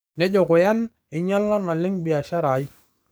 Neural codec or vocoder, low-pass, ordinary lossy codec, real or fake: codec, 44.1 kHz, 7.8 kbps, DAC; none; none; fake